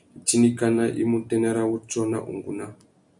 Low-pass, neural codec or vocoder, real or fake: 10.8 kHz; none; real